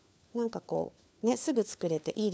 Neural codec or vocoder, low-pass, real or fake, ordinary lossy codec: codec, 16 kHz, 4 kbps, FunCodec, trained on LibriTTS, 50 frames a second; none; fake; none